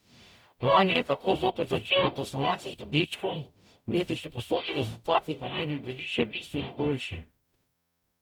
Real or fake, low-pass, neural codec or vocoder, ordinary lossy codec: fake; 19.8 kHz; codec, 44.1 kHz, 0.9 kbps, DAC; none